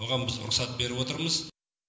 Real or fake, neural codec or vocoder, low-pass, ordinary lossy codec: real; none; none; none